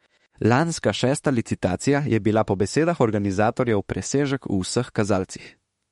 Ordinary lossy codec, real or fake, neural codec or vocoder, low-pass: MP3, 48 kbps; fake; autoencoder, 48 kHz, 32 numbers a frame, DAC-VAE, trained on Japanese speech; 19.8 kHz